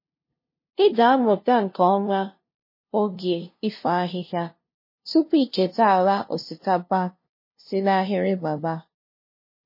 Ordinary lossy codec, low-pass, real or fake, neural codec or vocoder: MP3, 24 kbps; 5.4 kHz; fake; codec, 16 kHz, 0.5 kbps, FunCodec, trained on LibriTTS, 25 frames a second